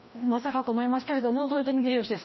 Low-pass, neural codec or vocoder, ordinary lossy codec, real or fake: 7.2 kHz; codec, 16 kHz, 1 kbps, FreqCodec, larger model; MP3, 24 kbps; fake